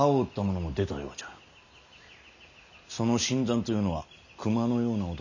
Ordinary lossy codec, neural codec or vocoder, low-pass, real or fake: none; none; 7.2 kHz; real